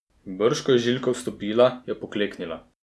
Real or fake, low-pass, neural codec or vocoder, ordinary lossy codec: real; none; none; none